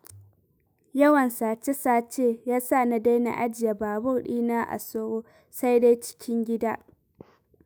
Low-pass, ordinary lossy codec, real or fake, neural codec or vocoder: none; none; fake; autoencoder, 48 kHz, 128 numbers a frame, DAC-VAE, trained on Japanese speech